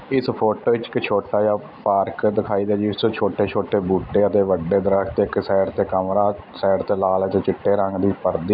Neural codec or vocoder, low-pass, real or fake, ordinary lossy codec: none; 5.4 kHz; real; none